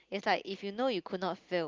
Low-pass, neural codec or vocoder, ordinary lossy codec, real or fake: 7.2 kHz; none; Opus, 32 kbps; real